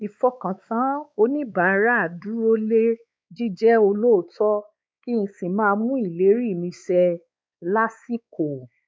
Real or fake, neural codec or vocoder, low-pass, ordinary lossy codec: fake; codec, 16 kHz, 4 kbps, X-Codec, WavLM features, trained on Multilingual LibriSpeech; none; none